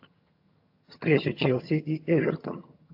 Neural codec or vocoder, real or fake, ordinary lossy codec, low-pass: vocoder, 22.05 kHz, 80 mel bands, HiFi-GAN; fake; AAC, 48 kbps; 5.4 kHz